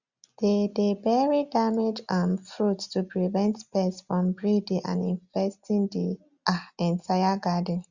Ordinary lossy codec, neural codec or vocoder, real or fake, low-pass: Opus, 64 kbps; none; real; 7.2 kHz